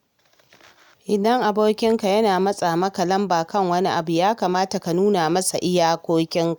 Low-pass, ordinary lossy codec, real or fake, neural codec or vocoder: none; none; real; none